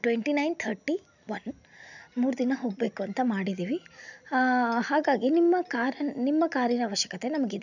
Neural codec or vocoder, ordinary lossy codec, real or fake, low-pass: none; none; real; 7.2 kHz